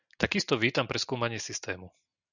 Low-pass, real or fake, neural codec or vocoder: 7.2 kHz; real; none